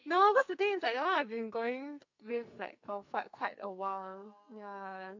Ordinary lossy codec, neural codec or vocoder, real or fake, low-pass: MP3, 48 kbps; codec, 44.1 kHz, 2.6 kbps, SNAC; fake; 7.2 kHz